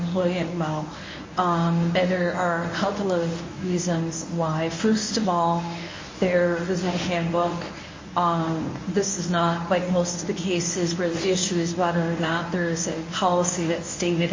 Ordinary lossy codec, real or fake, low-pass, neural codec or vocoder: MP3, 32 kbps; fake; 7.2 kHz; codec, 24 kHz, 0.9 kbps, WavTokenizer, medium speech release version 1